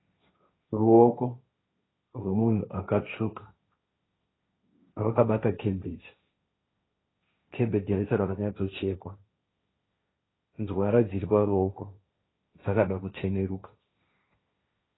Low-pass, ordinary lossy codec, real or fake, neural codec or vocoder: 7.2 kHz; AAC, 16 kbps; fake; codec, 16 kHz, 1.1 kbps, Voila-Tokenizer